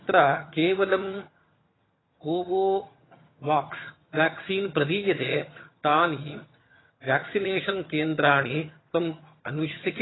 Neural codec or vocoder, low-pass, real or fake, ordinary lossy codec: vocoder, 22.05 kHz, 80 mel bands, HiFi-GAN; 7.2 kHz; fake; AAC, 16 kbps